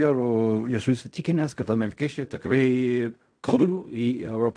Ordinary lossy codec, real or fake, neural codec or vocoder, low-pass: AAC, 64 kbps; fake; codec, 16 kHz in and 24 kHz out, 0.4 kbps, LongCat-Audio-Codec, fine tuned four codebook decoder; 9.9 kHz